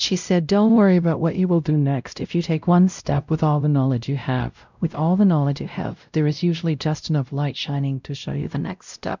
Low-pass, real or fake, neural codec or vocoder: 7.2 kHz; fake; codec, 16 kHz, 0.5 kbps, X-Codec, WavLM features, trained on Multilingual LibriSpeech